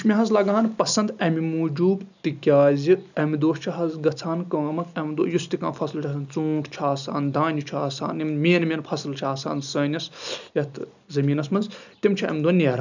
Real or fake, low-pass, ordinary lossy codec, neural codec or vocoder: real; 7.2 kHz; none; none